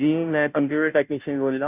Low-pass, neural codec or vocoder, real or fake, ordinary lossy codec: 3.6 kHz; codec, 16 kHz, 0.5 kbps, FunCodec, trained on Chinese and English, 25 frames a second; fake; none